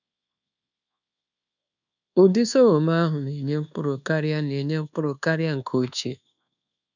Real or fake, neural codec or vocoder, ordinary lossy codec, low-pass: fake; codec, 24 kHz, 1.2 kbps, DualCodec; none; 7.2 kHz